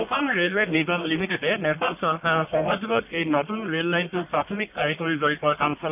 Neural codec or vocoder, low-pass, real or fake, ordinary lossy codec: codec, 44.1 kHz, 1.7 kbps, Pupu-Codec; 3.6 kHz; fake; none